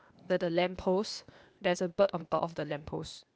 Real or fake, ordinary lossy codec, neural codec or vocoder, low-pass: fake; none; codec, 16 kHz, 0.8 kbps, ZipCodec; none